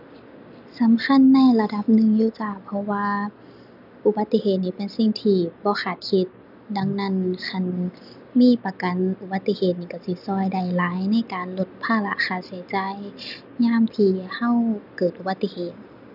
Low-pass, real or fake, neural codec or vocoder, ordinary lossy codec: 5.4 kHz; real; none; none